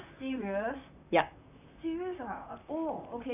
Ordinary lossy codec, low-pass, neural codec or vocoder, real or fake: none; 3.6 kHz; vocoder, 44.1 kHz, 128 mel bands, Pupu-Vocoder; fake